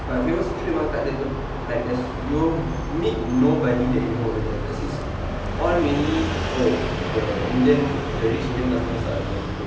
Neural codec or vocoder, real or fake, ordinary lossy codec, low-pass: none; real; none; none